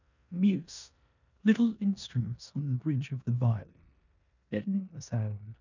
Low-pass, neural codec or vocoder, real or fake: 7.2 kHz; codec, 16 kHz in and 24 kHz out, 0.9 kbps, LongCat-Audio-Codec, four codebook decoder; fake